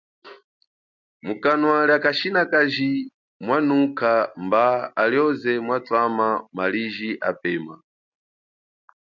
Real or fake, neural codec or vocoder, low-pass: real; none; 7.2 kHz